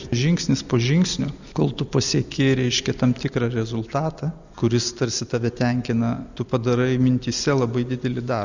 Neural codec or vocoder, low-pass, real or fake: none; 7.2 kHz; real